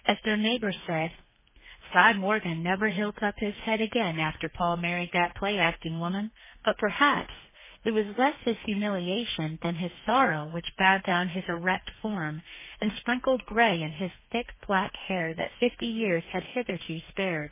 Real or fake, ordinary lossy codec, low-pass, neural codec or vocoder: fake; MP3, 16 kbps; 3.6 kHz; codec, 32 kHz, 1.9 kbps, SNAC